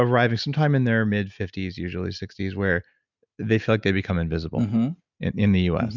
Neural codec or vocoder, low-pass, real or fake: none; 7.2 kHz; real